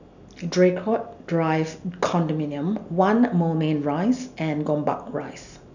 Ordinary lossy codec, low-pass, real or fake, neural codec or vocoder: none; 7.2 kHz; real; none